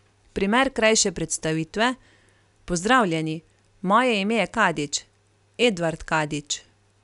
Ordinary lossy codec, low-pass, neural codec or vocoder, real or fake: none; 10.8 kHz; none; real